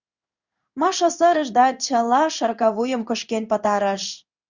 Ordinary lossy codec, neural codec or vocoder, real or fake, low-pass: Opus, 64 kbps; codec, 16 kHz in and 24 kHz out, 1 kbps, XY-Tokenizer; fake; 7.2 kHz